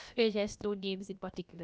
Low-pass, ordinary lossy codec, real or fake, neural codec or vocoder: none; none; fake; codec, 16 kHz, about 1 kbps, DyCAST, with the encoder's durations